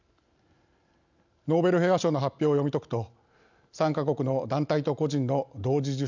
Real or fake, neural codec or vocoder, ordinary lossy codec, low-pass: real; none; none; 7.2 kHz